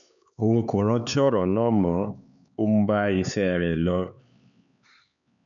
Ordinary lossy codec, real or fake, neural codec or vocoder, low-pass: none; fake; codec, 16 kHz, 4 kbps, X-Codec, HuBERT features, trained on LibriSpeech; 7.2 kHz